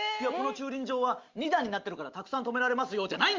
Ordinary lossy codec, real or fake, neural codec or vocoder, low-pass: Opus, 32 kbps; real; none; 7.2 kHz